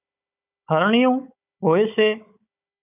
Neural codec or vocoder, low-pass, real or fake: codec, 16 kHz, 16 kbps, FunCodec, trained on Chinese and English, 50 frames a second; 3.6 kHz; fake